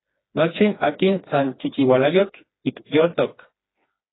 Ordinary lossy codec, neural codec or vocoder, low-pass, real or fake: AAC, 16 kbps; codec, 16 kHz, 2 kbps, FreqCodec, smaller model; 7.2 kHz; fake